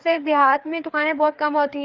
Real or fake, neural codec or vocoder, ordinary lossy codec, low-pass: fake; codec, 16 kHz, 4 kbps, FreqCodec, larger model; Opus, 32 kbps; 7.2 kHz